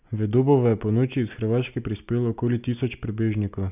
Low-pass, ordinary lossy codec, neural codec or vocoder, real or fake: 3.6 kHz; none; none; real